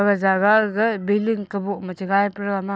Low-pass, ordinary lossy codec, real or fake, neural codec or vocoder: none; none; real; none